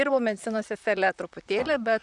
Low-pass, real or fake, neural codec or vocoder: 10.8 kHz; fake; vocoder, 44.1 kHz, 128 mel bands, Pupu-Vocoder